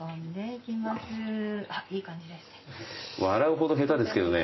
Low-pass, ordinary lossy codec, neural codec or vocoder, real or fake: 7.2 kHz; MP3, 24 kbps; none; real